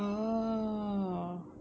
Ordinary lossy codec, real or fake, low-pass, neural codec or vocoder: none; real; none; none